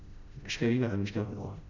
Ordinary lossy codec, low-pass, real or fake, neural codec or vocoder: none; 7.2 kHz; fake; codec, 16 kHz, 0.5 kbps, FreqCodec, smaller model